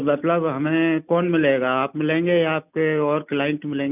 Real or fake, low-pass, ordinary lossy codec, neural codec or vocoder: real; 3.6 kHz; none; none